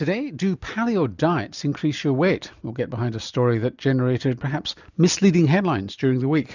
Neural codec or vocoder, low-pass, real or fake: none; 7.2 kHz; real